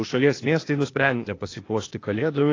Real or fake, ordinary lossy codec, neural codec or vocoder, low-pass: fake; AAC, 32 kbps; codec, 16 kHz, 0.8 kbps, ZipCodec; 7.2 kHz